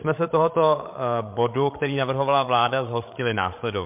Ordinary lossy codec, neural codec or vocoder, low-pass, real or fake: MP3, 32 kbps; codec, 16 kHz, 16 kbps, FreqCodec, larger model; 3.6 kHz; fake